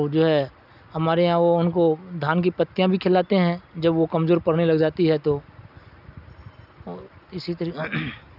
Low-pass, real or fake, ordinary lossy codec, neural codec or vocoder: 5.4 kHz; real; none; none